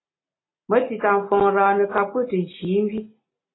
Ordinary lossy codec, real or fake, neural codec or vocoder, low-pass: AAC, 16 kbps; real; none; 7.2 kHz